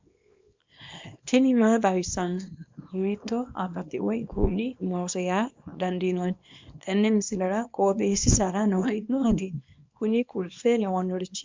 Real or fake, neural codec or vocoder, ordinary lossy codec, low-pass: fake; codec, 24 kHz, 0.9 kbps, WavTokenizer, small release; MP3, 64 kbps; 7.2 kHz